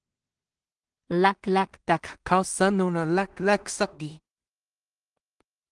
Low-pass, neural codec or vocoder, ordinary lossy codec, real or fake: 10.8 kHz; codec, 16 kHz in and 24 kHz out, 0.4 kbps, LongCat-Audio-Codec, two codebook decoder; Opus, 24 kbps; fake